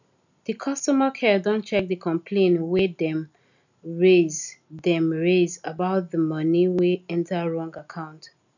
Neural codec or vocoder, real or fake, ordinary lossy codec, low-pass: none; real; none; 7.2 kHz